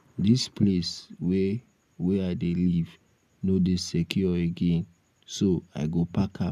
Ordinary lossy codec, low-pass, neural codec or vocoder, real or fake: none; 14.4 kHz; none; real